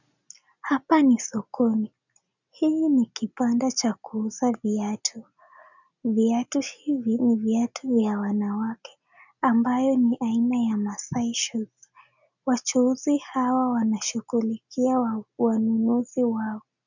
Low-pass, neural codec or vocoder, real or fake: 7.2 kHz; none; real